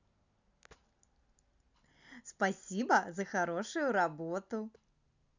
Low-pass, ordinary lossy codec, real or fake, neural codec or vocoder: 7.2 kHz; none; real; none